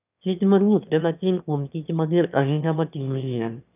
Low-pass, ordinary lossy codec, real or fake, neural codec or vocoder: 3.6 kHz; none; fake; autoencoder, 22.05 kHz, a latent of 192 numbers a frame, VITS, trained on one speaker